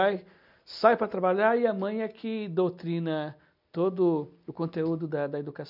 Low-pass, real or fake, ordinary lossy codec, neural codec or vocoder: 5.4 kHz; real; none; none